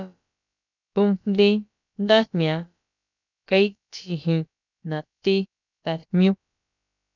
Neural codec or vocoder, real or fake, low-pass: codec, 16 kHz, about 1 kbps, DyCAST, with the encoder's durations; fake; 7.2 kHz